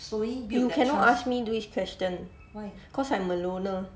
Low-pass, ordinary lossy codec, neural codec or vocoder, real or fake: none; none; none; real